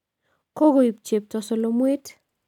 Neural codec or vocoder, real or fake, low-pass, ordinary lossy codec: none; real; 19.8 kHz; none